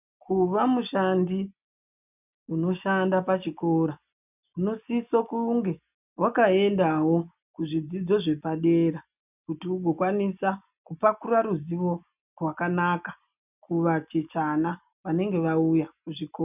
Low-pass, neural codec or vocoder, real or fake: 3.6 kHz; none; real